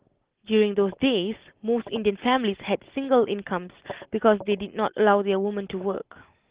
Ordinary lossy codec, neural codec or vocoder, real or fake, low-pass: Opus, 16 kbps; none; real; 3.6 kHz